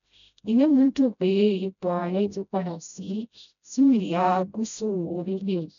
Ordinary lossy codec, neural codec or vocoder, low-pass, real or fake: none; codec, 16 kHz, 0.5 kbps, FreqCodec, smaller model; 7.2 kHz; fake